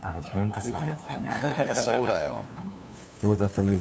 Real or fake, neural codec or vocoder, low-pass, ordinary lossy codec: fake; codec, 16 kHz, 2 kbps, FunCodec, trained on LibriTTS, 25 frames a second; none; none